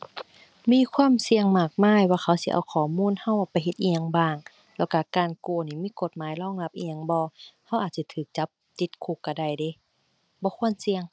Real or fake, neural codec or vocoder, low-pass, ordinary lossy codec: real; none; none; none